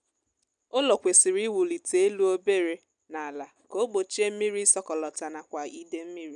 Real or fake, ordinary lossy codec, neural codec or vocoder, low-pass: real; none; none; 9.9 kHz